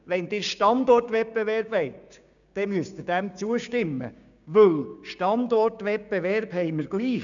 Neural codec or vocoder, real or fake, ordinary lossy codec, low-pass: codec, 16 kHz, 6 kbps, DAC; fake; none; 7.2 kHz